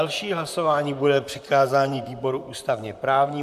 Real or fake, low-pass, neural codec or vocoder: fake; 14.4 kHz; vocoder, 44.1 kHz, 128 mel bands, Pupu-Vocoder